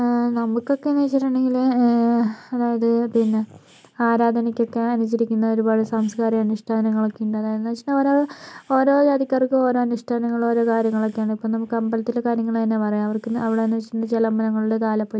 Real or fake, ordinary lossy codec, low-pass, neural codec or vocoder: real; none; none; none